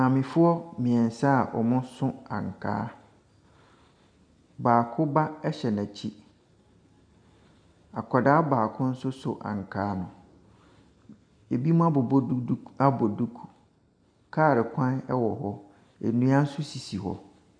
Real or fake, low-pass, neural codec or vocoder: real; 9.9 kHz; none